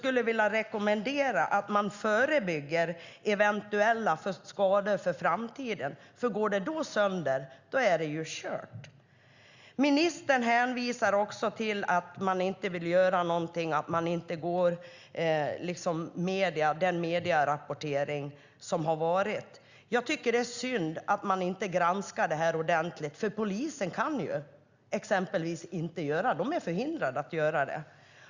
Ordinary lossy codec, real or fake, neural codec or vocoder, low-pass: Opus, 64 kbps; real; none; 7.2 kHz